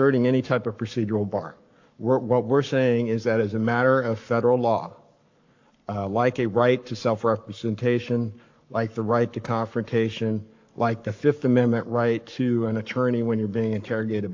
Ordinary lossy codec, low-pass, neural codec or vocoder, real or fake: AAC, 48 kbps; 7.2 kHz; codec, 44.1 kHz, 7.8 kbps, Pupu-Codec; fake